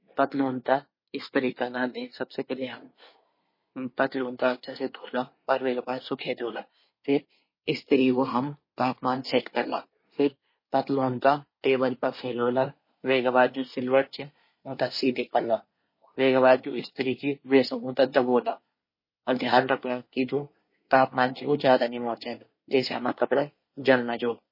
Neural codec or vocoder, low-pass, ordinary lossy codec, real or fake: codec, 24 kHz, 1 kbps, SNAC; 5.4 kHz; MP3, 24 kbps; fake